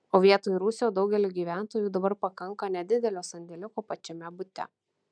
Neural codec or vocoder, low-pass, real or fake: none; 9.9 kHz; real